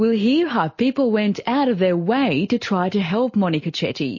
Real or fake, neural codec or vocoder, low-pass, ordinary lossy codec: real; none; 7.2 kHz; MP3, 32 kbps